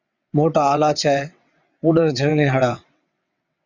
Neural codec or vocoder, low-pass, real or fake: vocoder, 22.05 kHz, 80 mel bands, WaveNeXt; 7.2 kHz; fake